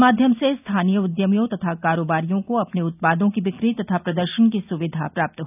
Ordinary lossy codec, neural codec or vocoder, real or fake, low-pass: none; none; real; 3.6 kHz